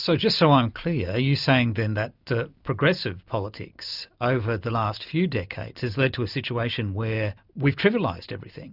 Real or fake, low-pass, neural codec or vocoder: real; 5.4 kHz; none